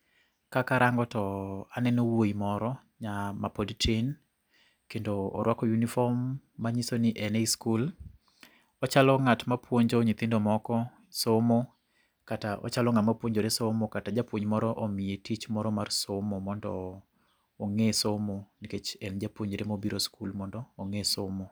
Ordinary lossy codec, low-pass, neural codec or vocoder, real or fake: none; none; none; real